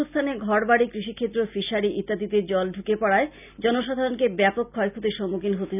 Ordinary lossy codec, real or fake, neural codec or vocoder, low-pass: none; real; none; 3.6 kHz